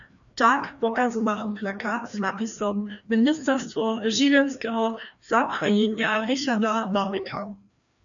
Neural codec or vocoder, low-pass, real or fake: codec, 16 kHz, 1 kbps, FreqCodec, larger model; 7.2 kHz; fake